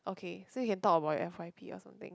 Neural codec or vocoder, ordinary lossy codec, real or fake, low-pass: none; none; real; none